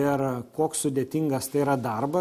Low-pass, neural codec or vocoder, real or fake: 14.4 kHz; none; real